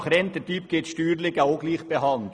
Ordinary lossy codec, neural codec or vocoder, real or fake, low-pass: none; none; real; none